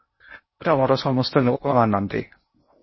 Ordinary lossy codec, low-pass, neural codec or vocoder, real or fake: MP3, 24 kbps; 7.2 kHz; codec, 16 kHz in and 24 kHz out, 0.6 kbps, FocalCodec, streaming, 2048 codes; fake